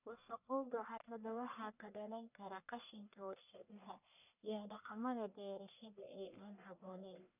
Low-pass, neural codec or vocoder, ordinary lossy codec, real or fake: 3.6 kHz; codec, 44.1 kHz, 1.7 kbps, Pupu-Codec; none; fake